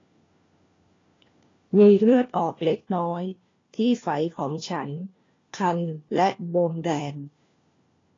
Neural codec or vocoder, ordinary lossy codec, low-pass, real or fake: codec, 16 kHz, 1 kbps, FunCodec, trained on LibriTTS, 50 frames a second; AAC, 32 kbps; 7.2 kHz; fake